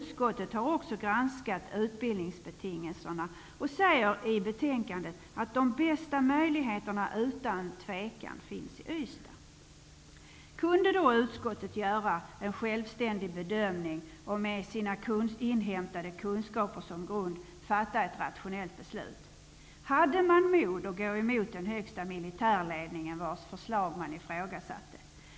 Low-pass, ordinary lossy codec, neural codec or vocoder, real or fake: none; none; none; real